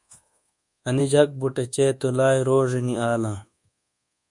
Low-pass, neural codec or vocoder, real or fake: 10.8 kHz; codec, 24 kHz, 1.2 kbps, DualCodec; fake